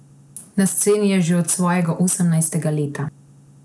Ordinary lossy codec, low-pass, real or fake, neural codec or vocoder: none; none; real; none